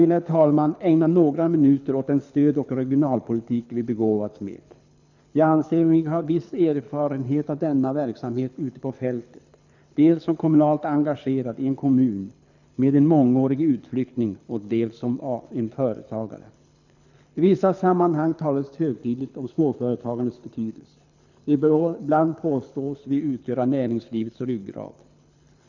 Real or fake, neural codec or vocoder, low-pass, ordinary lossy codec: fake; codec, 24 kHz, 6 kbps, HILCodec; 7.2 kHz; none